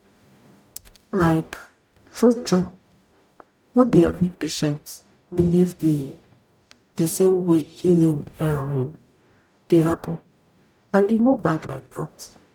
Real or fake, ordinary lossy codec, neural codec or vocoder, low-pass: fake; none; codec, 44.1 kHz, 0.9 kbps, DAC; 19.8 kHz